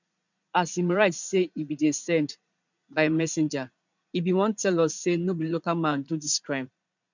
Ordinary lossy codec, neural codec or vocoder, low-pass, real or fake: none; vocoder, 44.1 kHz, 80 mel bands, Vocos; 7.2 kHz; fake